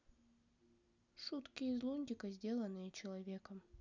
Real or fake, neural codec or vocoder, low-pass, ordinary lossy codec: real; none; 7.2 kHz; none